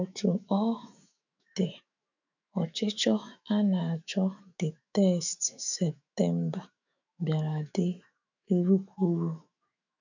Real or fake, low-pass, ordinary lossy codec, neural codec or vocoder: fake; 7.2 kHz; none; autoencoder, 48 kHz, 128 numbers a frame, DAC-VAE, trained on Japanese speech